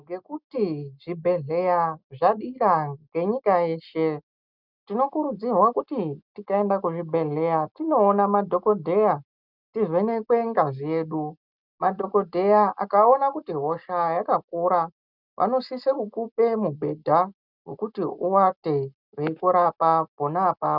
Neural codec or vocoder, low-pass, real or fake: none; 5.4 kHz; real